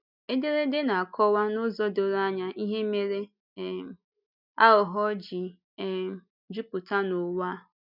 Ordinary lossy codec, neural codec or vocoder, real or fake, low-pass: none; none; real; 5.4 kHz